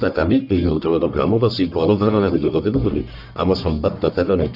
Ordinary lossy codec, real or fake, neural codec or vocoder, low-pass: none; fake; codec, 44.1 kHz, 1.7 kbps, Pupu-Codec; 5.4 kHz